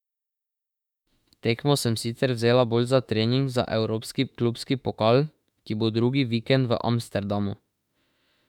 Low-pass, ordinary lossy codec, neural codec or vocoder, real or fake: 19.8 kHz; none; autoencoder, 48 kHz, 32 numbers a frame, DAC-VAE, trained on Japanese speech; fake